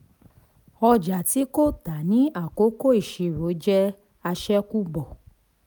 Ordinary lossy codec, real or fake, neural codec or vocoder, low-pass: none; real; none; none